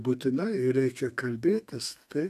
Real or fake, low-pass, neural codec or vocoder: fake; 14.4 kHz; codec, 32 kHz, 1.9 kbps, SNAC